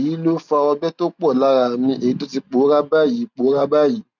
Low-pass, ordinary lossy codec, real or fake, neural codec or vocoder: 7.2 kHz; none; real; none